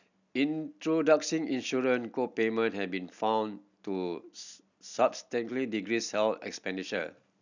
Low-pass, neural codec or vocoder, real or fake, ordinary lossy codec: 7.2 kHz; none; real; none